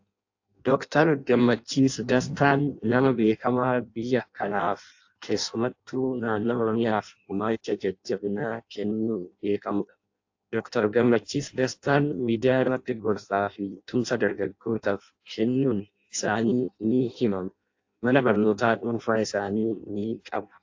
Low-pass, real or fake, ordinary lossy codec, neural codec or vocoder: 7.2 kHz; fake; AAC, 48 kbps; codec, 16 kHz in and 24 kHz out, 0.6 kbps, FireRedTTS-2 codec